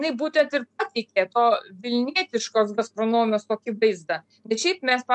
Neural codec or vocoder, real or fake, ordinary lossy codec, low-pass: none; real; MP3, 64 kbps; 10.8 kHz